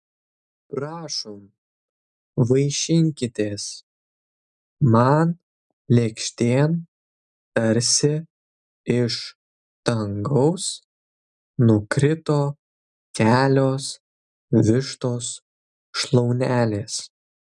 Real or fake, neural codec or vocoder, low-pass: real; none; 10.8 kHz